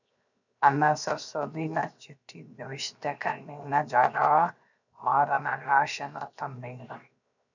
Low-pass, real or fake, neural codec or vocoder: 7.2 kHz; fake; codec, 16 kHz, 0.7 kbps, FocalCodec